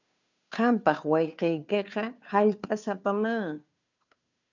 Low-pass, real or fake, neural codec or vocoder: 7.2 kHz; fake; codec, 16 kHz, 2 kbps, FunCodec, trained on Chinese and English, 25 frames a second